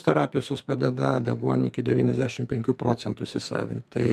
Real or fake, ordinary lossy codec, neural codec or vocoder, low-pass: fake; MP3, 96 kbps; codec, 44.1 kHz, 2.6 kbps, SNAC; 14.4 kHz